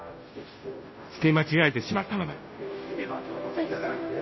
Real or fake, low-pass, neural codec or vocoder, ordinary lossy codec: fake; 7.2 kHz; codec, 16 kHz, 0.5 kbps, FunCodec, trained on Chinese and English, 25 frames a second; MP3, 24 kbps